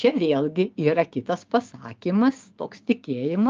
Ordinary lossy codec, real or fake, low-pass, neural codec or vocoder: Opus, 24 kbps; fake; 7.2 kHz; codec, 16 kHz, 6 kbps, DAC